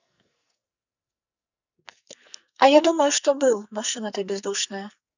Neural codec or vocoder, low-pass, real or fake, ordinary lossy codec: codec, 44.1 kHz, 2.6 kbps, SNAC; 7.2 kHz; fake; none